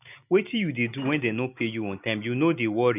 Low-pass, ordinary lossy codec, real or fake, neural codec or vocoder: 3.6 kHz; none; real; none